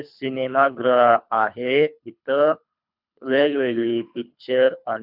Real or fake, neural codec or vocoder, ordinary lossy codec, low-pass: fake; codec, 24 kHz, 3 kbps, HILCodec; none; 5.4 kHz